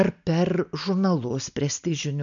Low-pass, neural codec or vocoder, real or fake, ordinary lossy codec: 7.2 kHz; none; real; MP3, 96 kbps